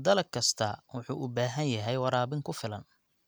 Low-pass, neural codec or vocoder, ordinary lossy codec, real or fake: none; none; none; real